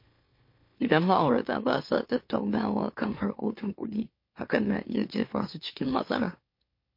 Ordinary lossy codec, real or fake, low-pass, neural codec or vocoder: MP3, 32 kbps; fake; 5.4 kHz; autoencoder, 44.1 kHz, a latent of 192 numbers a frame, MeloTTS